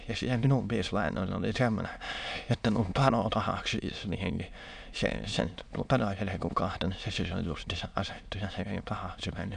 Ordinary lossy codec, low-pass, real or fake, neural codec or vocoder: none; 9.9 kHz; fake; autoencoder, 22.05 kHz, a latent of 192 numbers a frame, VITS, trained on many speakers